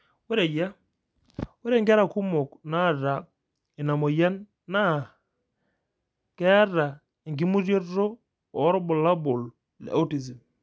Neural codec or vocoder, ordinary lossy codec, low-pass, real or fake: none; none; none; real